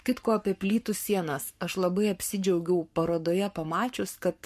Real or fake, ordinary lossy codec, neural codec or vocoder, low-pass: fake; MP3, 64 kbps; codec, 44.1 kHz, 7.8 kbps, Pupu-Codec; 14.4 kHz